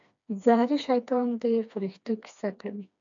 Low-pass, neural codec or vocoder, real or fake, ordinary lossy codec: 7.2 kHz; codec, 16 kHz, 2 kbps, FreqCodec, smaller model; fake; MP3, 64 kbps